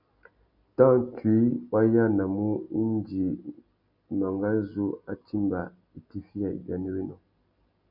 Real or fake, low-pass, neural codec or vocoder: real; 5.4 kHz; none